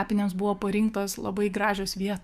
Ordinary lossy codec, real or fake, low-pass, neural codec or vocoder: Opus, 64 kbps; fake; 14.4 kHz; autoencoder, 48 kHz, 128 numbers a frame, DAC-VAE, trained on Japanese speech